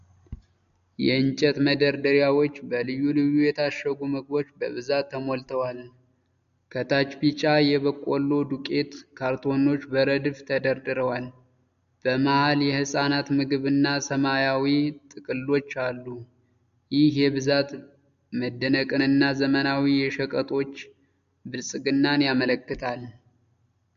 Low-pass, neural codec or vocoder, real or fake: 7.2 kHz; none; real